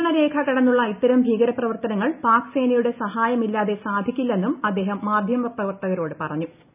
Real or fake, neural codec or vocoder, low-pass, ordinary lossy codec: real; none; 3.6 kHz; none